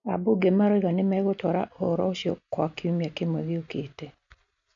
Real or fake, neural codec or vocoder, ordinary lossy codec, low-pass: real; none; none; 7.2 kHz